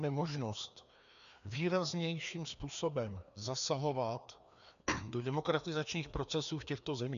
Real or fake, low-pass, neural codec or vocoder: fake; 7.2 kHz; codec, 16 kHz, 2 kbps, FreqCodec, larger model